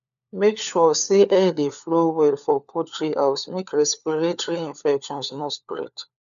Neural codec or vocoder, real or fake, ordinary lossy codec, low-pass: codec, 16 kHz, 4 kbps, FunCodec, trained on LibriTTS, 50 frames a second; fake; none; 7.2 kHz